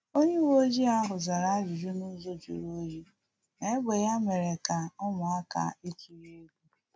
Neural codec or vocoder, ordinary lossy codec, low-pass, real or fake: none; none; none; real